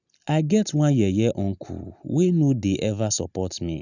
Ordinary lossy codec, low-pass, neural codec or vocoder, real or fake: none; 7.2 kHz; none; real